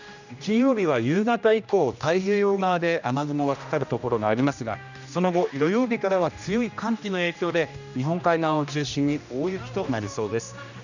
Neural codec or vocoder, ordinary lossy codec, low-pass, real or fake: codec, 16 kHz, 1 kbps, X-Codec, HuBERT features, trained on general audio; none; 7.2 kHz; fake